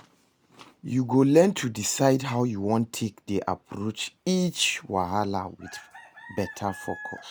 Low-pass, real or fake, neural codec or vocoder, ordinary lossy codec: none; real; none; none